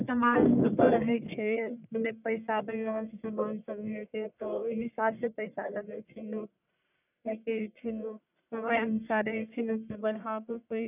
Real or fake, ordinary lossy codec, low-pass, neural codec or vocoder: fake; none; 3.6 kHz; codec, 44.1 kHz, 1.7 kbps, Pupu-Codec